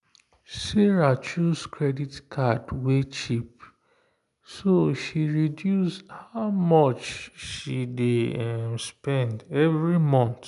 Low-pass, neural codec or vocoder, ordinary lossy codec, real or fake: 10.8 kHz; none; none; real